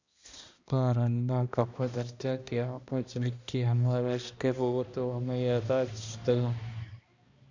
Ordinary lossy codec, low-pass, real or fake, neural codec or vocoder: Opus, 64 kbps; 7.2 kHz; fake; codec, 16 kHz, 1 kbps, X-Codec, HuBERT features, trained on balanced general audio